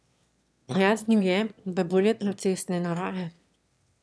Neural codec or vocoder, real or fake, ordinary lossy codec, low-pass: autoencoder, 22.05 kHz, a latent of 192 numbers a frame, VITS, trained on one speaker; fake; none; none